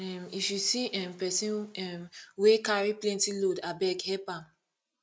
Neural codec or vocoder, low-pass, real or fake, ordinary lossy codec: none; none; real; none